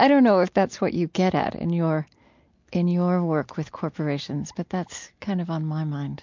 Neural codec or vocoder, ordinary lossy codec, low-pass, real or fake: none; MP3, 48 kbps; 7.2 kHz; real